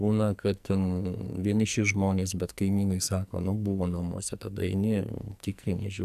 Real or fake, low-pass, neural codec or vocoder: fake; 14.4 kHz; codec, 44.1 kHz, 2.6 kbps, SNAC